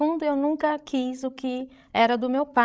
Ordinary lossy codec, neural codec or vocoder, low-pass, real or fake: none; codec, 16 kHz, 16 kbps, FreqCodec, larger model; none; fake